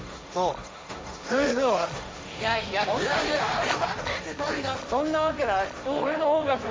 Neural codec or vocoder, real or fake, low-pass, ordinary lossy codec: codec, 16 kHz, 1.1 kbps, Voila-Tokenizer; fake; none; none